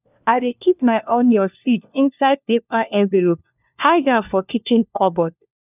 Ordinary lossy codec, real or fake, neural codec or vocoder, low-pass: none; fake; codec, 16 kHz, 1 kbps, FunCodec, trained on LibriTTS, 50 frames a second; 3.6 kHz